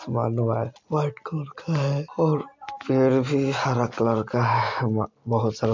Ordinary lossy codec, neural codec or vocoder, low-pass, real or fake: MP3, 48 kbps; none; 7.2 kHz; real